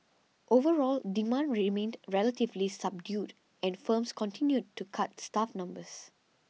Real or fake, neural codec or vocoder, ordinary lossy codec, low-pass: real; none; none; none